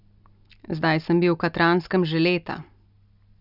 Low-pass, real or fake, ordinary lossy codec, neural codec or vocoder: 5.4 kHz; real; none; none